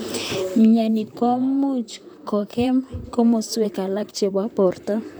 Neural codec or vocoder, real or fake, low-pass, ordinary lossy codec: vocoder, 44.1 kHz, 128 mel bands, Pupu-Vocoder; fake; none; none